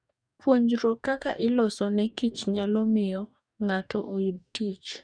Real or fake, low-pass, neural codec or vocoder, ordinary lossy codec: fake; 9.9 kHz; codec, 44.1 kHz, 2.6 kbps, DAC; none